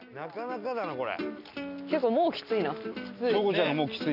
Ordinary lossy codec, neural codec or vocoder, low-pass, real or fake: none; none; 5.4 kHz; real